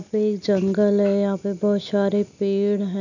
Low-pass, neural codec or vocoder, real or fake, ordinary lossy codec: 7.2 kHz; none; real; none